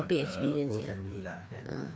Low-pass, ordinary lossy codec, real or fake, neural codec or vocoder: none; none; fake; codec, 16 kHz, 2 kbps, FreqCodec, larger model